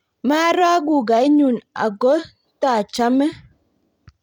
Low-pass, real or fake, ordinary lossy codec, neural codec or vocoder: 19.8 kHz; fake; none; vocoder, 44.1 kHz, 128 mel bands every 256 samples, BigVGAN v2